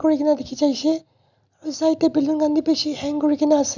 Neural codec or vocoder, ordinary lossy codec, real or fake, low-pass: none; none; real; 7.2 kHz